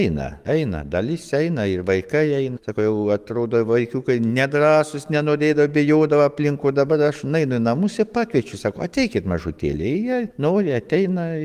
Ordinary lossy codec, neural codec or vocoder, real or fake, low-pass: Opus, 24 kbps; autoencoder, 48 kHz, 128 numbers a frame, DAC-VAE, trained on Japanese speech; fake; 14.4 kHz